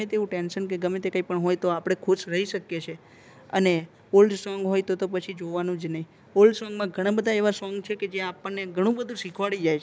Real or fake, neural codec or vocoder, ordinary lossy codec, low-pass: real; none; none; none